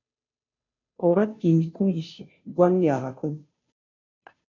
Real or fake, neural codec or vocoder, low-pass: fake; codec, 16 kHz, 0.5 kbps, FunCodec, trained on Chinese and English, 25 frames a second; 7.2 kHz